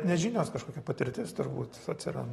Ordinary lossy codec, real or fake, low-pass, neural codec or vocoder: AAC, 32 kbps; real; 19.8 kHz; none